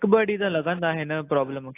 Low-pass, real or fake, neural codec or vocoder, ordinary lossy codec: 3.6 kHz; real; none; AAC, 24 kbps